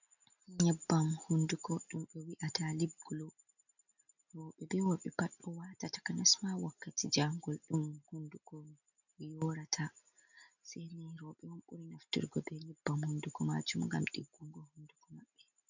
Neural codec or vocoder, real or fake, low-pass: none; real; 7.2 kHz